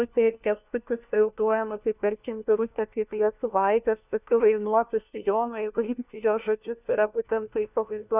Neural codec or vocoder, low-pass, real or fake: codec, 16 kHz, 1 kbps, FunCodec, trained on LibriTTS, 50 frames a second; 3.6 kHz; fake